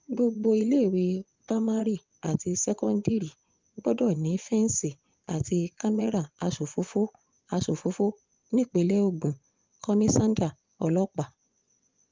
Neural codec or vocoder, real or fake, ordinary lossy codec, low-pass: vocoder, 24 kHz, 100 mel bands, Vocos; fake; Opus, 24 kbps; 7.2 kHz